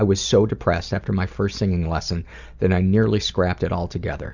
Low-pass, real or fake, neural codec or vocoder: 7.2 kHz; real; none